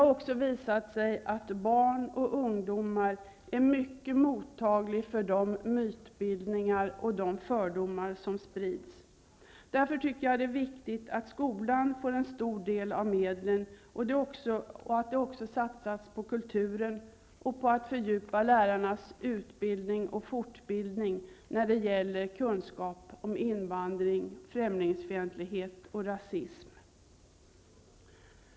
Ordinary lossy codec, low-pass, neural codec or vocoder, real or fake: none; none; none; real